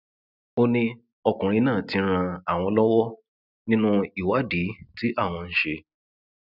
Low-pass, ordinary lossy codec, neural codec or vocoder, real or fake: 5.4 kHz; none; none; real